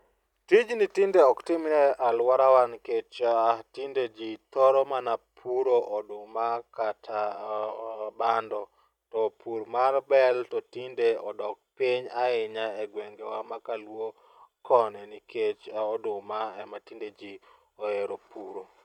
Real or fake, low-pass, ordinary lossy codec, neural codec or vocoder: real; 19.8 kHz; none; none